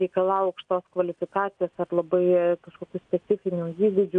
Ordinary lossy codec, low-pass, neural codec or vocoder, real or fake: MP3, 64 kbps; 9.9 kHz; none; real